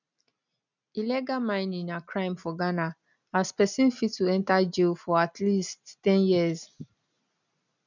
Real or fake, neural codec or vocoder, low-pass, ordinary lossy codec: real; none; 7.2 kHz; none